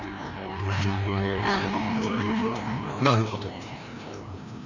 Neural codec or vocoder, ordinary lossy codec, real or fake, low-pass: codec, 16 kHz, 1 kbps, FreqCodec, larger model; none; fake; 7.2 kHz